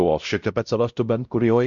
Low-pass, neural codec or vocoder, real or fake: 7.2 kHz; codec, 16 kHz, 0.5 kbps, X-Codec, WavLM features, trained on Multilingual LibriSpeech; fake